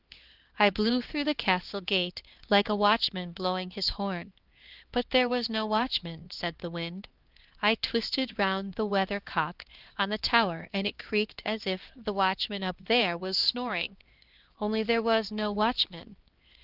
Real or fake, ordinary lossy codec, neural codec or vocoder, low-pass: fake; Opus, 16 kbps; codec, 16 kHz, 2 kbps, X-Codec, HuBERT features, trained on LibriSpeech; 5.4 kHz